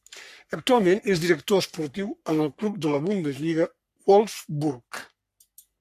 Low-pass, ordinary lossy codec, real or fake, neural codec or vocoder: 14.4 kHz; MP3, 96 kbps; fake; codec, 44.1 kHz, 3.4 kbps, Pupu-Codec